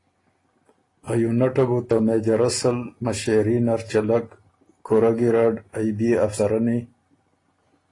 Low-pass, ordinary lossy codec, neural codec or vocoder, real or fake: 10.8 kHz; AAC, 32 kbps; none; real